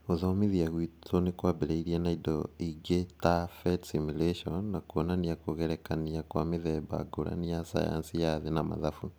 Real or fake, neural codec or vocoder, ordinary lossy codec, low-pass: real; none; none; none